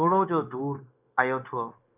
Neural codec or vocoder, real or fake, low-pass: codec, 16 kHz, 0.9 kbps, LongCat-Audio-Codec; fake; 3.6 kHz